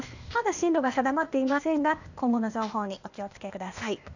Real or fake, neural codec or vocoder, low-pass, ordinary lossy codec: fake; codec, 16 kHz, 0.8 kbps, ZipCodec; 7.2 kHz; none